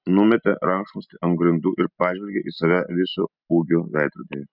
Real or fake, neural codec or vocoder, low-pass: real; none; 5.4 kHz